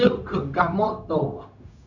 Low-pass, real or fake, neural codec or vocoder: 7.2 kHz; fake; codec, 16 kHz, 0.4 kbps, LongCat-Audio-Codec